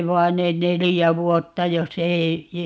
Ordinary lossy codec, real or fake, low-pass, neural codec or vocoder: none; real; none; none